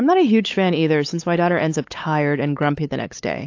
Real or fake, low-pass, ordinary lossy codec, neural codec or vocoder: fake; 7.2 kHz; AAC, 48 kbps; codec, 16 kHz, 8 kbps, FunCodec, trained on LibriTTS, 25 frames a second